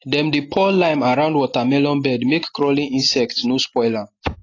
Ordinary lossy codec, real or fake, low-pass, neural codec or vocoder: AAC, 32 kbps; real; 7.2 kHz; none